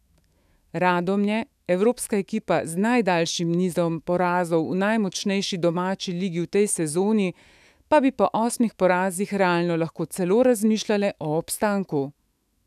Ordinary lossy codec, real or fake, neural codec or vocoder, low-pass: none; fake; autoencoder, 48 kHz, 128 numbers a frame, DAC-VAE, trained on Japanese speech; 14.4 kHz